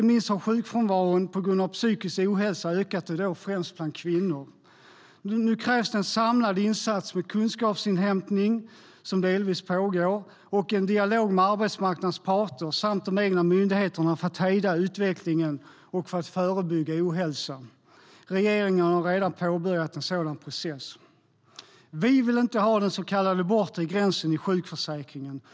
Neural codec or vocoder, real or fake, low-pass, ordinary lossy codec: none; real; none; none